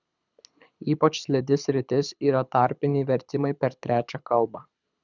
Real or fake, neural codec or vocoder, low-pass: fake; codec, 24 kHz, 6 kbps, HILCodec; 7.2 kHz